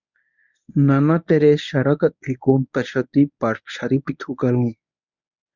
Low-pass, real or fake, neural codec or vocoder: 7.2 kHz; fake; codec, 24 kHz, 0.9 kbps, WavTokenizer, medium speech release version 1